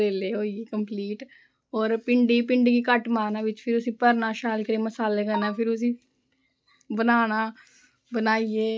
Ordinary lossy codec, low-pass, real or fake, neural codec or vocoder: none; none; real; none